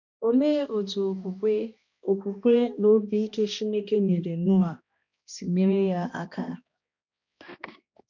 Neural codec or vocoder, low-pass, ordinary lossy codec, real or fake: codec, 16 kHz, 1 kbps, X-Codec, HuBERT features, trained on balanced general audio; 7.2 kHz; none; fake